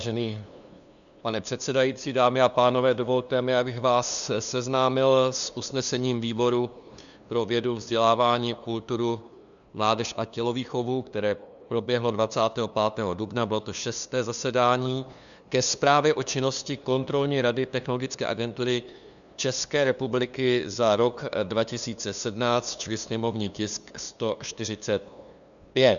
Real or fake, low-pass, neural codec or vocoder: fake; 7.2 kHz; codec, 16 kHz, 2 kbps, FunCodec, trained on LibriTTS, 25 frames a second